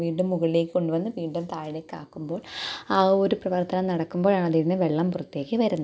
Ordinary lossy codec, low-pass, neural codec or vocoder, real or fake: none; none; none; real